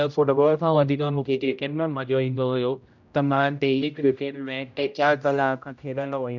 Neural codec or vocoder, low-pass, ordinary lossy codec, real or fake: codec, 16 kHz, 0.5 kbps, X-Codec, HuBERT features, trained on general audio; 7.2 kHz; none; fake